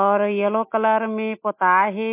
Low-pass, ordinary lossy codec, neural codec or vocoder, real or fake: 3.6 kHz; MP3, 24 kbps; none; real